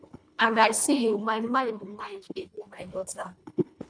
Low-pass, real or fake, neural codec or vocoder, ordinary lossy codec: 9.9 kHz; fake; codec, 24 kHz, 1.5 kbps, HILCodec; none